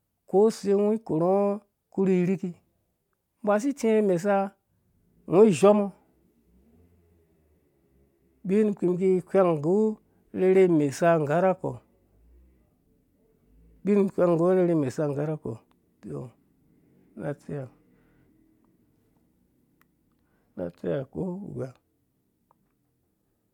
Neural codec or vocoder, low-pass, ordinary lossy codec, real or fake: none; 19.8 kHz; MP3, 96 kbps; real